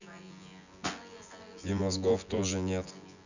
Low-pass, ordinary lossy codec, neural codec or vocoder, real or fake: 7.2 kHz; none; vocoder, 24 kHz, 100 mel bands, Vocos; fake